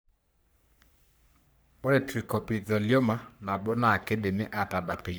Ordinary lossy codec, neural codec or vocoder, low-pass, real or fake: none; codec, 44.1 kHz, 3.4 kbps, Pupu-Codec; none; fake